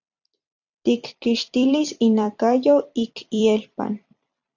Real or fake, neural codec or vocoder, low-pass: fake; vocoder, 44.1 kHz, 128 mel bands every 256 samples, BigVGAN v2; 7.2 kHz